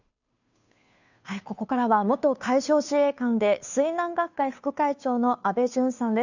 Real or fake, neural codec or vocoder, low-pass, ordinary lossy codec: fake; codec, 16 kHz, 2 kbps, FunCodec, trained on Chinese and English, 25 frames a second; 7.2 kHz; none